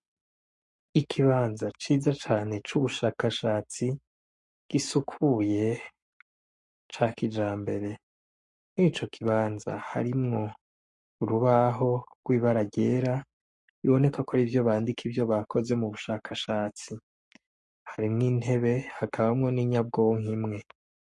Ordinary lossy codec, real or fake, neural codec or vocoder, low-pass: MP3, 48 kbps; fake; codec, 44.1 kHz, 7.8 kbps, Pupu-Codec; 10.8 kHz